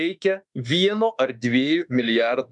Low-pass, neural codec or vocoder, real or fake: 10.8 kHz; autoencoder, 48 kHz, 32 numbers a frame, DAC-VAE, trained on Japanese speech; fake